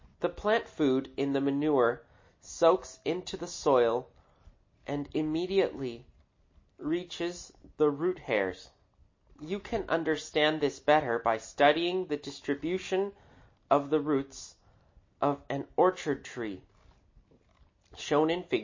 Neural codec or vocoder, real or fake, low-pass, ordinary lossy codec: none; real; 7.2 kHz; MP3, 32 kbps